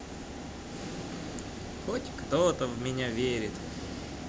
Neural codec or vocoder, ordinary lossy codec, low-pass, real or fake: none; none; none; real